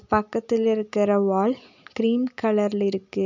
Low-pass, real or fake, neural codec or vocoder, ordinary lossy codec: 7.2 kHz; real; none; none